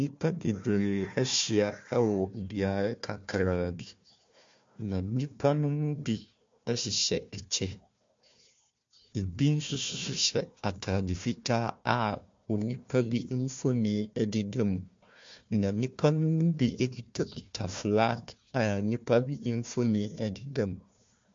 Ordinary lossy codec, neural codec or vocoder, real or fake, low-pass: MP3, 48 kbps; codec, 16 kHz, 1 kbps, FunCodec, trained on Chinese and English, 50 frames a second; fake; 7.2 kHz